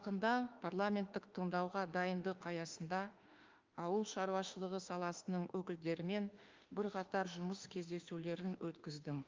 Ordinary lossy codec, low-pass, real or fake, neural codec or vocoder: Opus, 24 kbps; 7.2 kHz; fake; autoencoder, 48 kHz, 32 numbers a frame, DAC-VAE, trained on Japanese speech